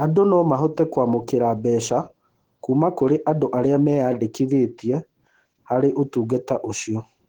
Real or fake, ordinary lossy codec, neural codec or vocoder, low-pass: fake; Opus, 16 kbps; autoencoder, 48 kHz, 128 numbers a frame, DAC-VAE, trained on Japanese speech; 19.8 kHz